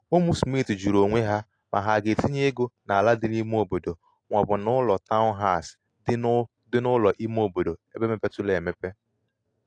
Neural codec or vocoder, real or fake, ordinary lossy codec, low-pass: none; real; AAC, 48 kbps; 9.9 kHz